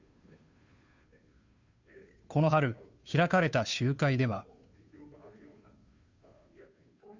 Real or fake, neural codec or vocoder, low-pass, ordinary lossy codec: fake; codec, 16 kHz, 2 kbps, FunCodec, trained on Chinese and English, 25 frames a second; 7.2 kHz; Opus, 64 kbps